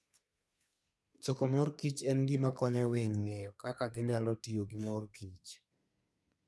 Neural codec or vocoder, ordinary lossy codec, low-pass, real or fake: codec, 24 kHz, 1 kbps, SNAC; none; none; fake